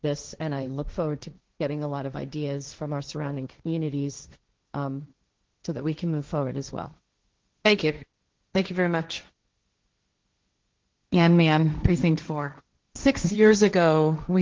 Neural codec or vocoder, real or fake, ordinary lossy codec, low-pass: codec, 16 kHz, 1.1 kbps, Voila-Tokenizer; fake; Opus, 16 kbps; 7.2 kHz